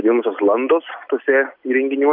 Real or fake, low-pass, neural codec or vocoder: real; 5.4 kHz; none